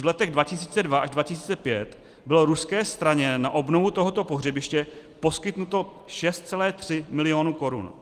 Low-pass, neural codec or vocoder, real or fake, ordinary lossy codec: 14.4 kHz; none; real; Opus, 24 kbps